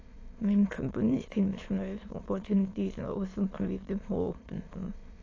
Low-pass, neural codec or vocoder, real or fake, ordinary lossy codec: 7.2 kHz; autoencoder, 22.05 kHz, a latent of 192 numbers a frame, VITS, trained on many speakers; fake; AAC, 32 kbps